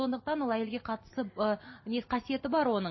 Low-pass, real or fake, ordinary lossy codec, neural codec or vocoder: 7.2 kHz; real; MP3, 24 kbps; none